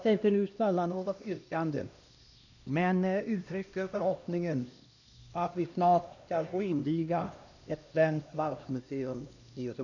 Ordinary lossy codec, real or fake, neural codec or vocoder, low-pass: none; fake; codec, 16 kHz, 1 kbps, X-Codec, HuBERT features, trained on LibriSpeech; 7.2 kHz